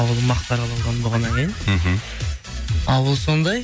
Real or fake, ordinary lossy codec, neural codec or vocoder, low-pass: real; none; none; none